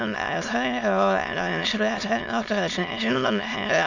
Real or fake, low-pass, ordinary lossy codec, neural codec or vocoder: fake; 7.2 kHz; none; autoencoder, 22.05 kHz, a latent of 192 numbers a frame, VITS, trained on many speakers